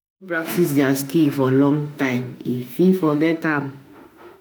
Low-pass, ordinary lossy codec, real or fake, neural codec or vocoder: none; none; fake; autoencoder, 48 kHz, 32 numbers a frame, DAC-VAE, trained on Japanese speech